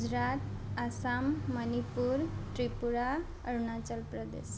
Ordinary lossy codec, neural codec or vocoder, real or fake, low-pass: none; none; real; none